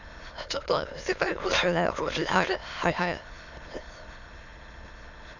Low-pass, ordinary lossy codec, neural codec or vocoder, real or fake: 7.2 kHz; none; autoencoder, 22.05 kHz, a latent of 192 numbers a frame, VITS, trained on many speakers; fake